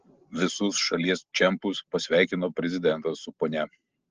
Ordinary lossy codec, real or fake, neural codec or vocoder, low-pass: Opus, 16 kbps; real; none; 7.2 kHz